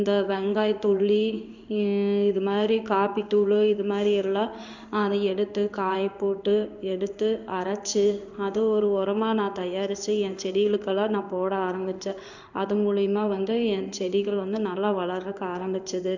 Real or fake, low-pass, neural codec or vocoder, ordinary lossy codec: fake; 7.2 kHz; codec, 16 kHz in and 24 kHz out, 1 kbps, XY-Tokenizer; none